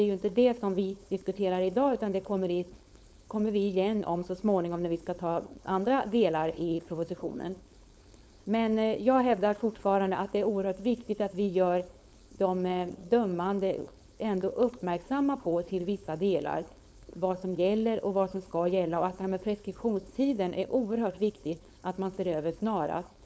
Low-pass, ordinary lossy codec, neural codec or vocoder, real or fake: none; none; codec, 16 kHz, 4.8 kbps, FACodec; fake